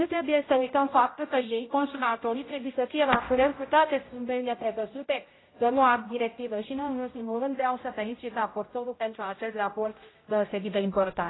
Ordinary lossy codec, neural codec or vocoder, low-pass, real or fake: AAC, 16 kbps; codec, 16 kHz, 0.5 kbps, X-Codec, HuBERT features, trained on general audio; 7.2 kHz; fake